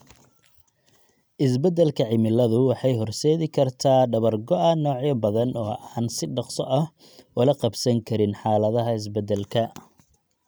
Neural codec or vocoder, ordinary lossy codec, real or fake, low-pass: none; none; real; none